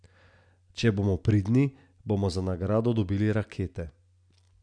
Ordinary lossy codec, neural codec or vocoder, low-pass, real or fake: none; none; 9.9 kHz; real